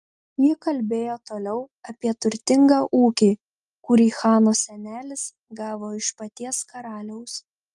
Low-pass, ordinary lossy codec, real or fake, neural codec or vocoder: 10.8 kHz; Opus, 32 kbps; real; none